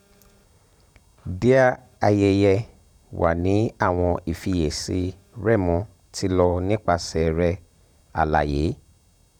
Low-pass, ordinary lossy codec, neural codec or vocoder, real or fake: 19.8 kHz; none; vocoder, 44.1 kHz, 128 mel bands every 512 samples, BigVGAN v2; fake